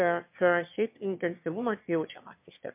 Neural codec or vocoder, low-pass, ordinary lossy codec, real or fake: autoencoder, 22.05 kHz, a latent of 192 numbers a frame, VITS, trained on one speaker; 3.6 kHz; MP3, 32 kbps; fake